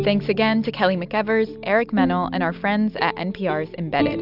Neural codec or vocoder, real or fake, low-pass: none; real; 5.4 kHz